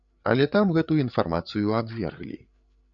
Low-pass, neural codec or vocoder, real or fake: 7.2 kHz; codec, 16 kHz, 8 kbps, FreqCodec, larger model; fake